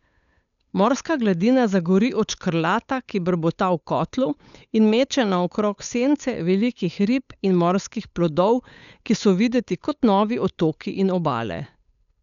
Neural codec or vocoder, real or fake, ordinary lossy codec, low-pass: codec, 16 kHz, 8 kbps, FunCodec, trained on Chinese and English, 25 frames a second; fake; none; 7.2 kHz